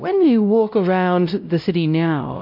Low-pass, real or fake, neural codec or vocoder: 5.4 kHz; fake; codec, 16 kHz, 0.5 kbps, X-Codec, WavLM features, trained on Multilingual LibriSpeech